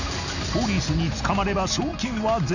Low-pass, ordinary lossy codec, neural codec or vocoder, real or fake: 7.2 kHz; none; none; real